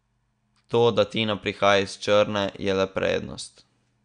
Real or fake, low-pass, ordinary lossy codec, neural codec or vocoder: real; 9.9 kHz; none; none